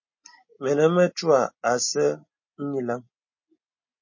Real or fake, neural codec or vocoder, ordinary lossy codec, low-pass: real; none; MP3, 32 kbps; 7.2 kHz